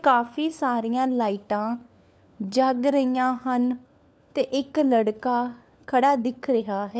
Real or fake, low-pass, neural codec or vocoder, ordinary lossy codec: fake; none; codec, 16 kHz, 4 kbps, FunCodec, trained on LibriTTS, 50 frames a second; none